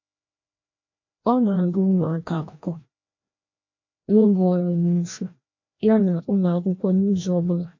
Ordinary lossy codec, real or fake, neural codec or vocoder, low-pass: MP3, 48 kbps; fake; codec, 16 kHz, 1 kbps, FreqCodec, larger model; 7.2 kHz